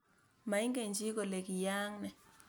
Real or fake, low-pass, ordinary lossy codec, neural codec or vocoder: real; none; none; none